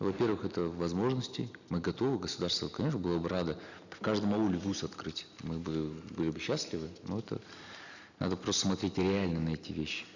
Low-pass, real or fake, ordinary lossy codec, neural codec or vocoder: 7.2 kHz; real; none; none